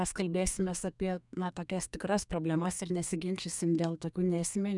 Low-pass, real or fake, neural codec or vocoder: 10.8 kHz; fake; codec, 32 kHz, 1.9 kbps, SNAC